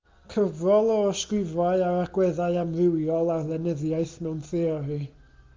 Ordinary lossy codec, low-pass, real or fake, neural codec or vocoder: Opus, 32 kbps; 7.2 kHz; real; none